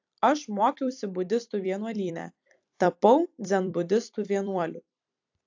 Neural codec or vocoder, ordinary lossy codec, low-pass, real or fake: vocoder, 44.1 kHz, 128 mel bands every 256 samples, BigVGAN v2; AAC, 48 kbps; 7.2 kHz; fake